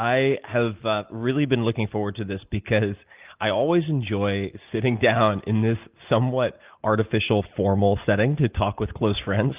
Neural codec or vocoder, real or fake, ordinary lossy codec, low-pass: none; real; Opus, 24 kbps; 3.6 kHz